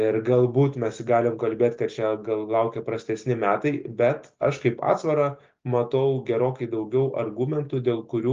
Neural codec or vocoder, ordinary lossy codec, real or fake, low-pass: none; Opus, 32 kbps; real; 7.2 kHz